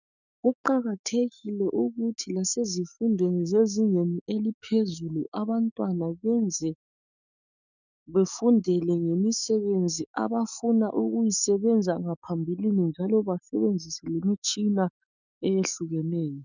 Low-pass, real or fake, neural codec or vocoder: 7.2 kHz; fake; autoencoder, 48 kHz, 128 numbers a frame, DAC-VAE, trained on Japanese speech